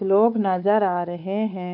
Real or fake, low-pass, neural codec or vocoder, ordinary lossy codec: fake; 5.4 kHz; codec, 16 kHz, 4 kbps, X-Codec, WavLM features, trained on Multilingual LibriSpeech; none